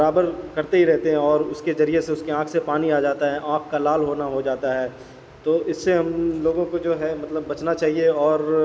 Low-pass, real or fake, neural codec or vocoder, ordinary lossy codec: none; real; none; none